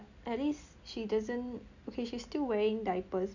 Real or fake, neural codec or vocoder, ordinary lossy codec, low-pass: real; none; none; 7.2 kHz